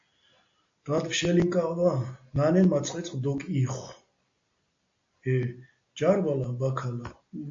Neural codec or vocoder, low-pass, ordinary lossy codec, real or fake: none; 7.2 kHz; AAC, 48 kbps; real